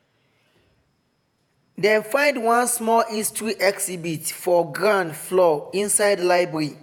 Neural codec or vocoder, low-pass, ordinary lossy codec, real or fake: vocoder, 48 kHz, 128 mel bands, Vocos; none; none; fake